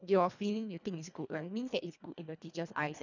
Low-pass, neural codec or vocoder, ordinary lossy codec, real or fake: 7.2 kHz; codec, 24 kHz, 1.5 kbps, HILCodec; none; fake